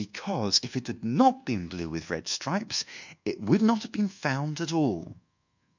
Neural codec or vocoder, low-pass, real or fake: codec, 24 kHz, 1.2 kbps, DualCodec; 7.2 kHz; fake